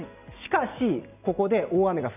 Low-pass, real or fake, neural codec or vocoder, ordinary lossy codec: 3.6 kHz; real; none; none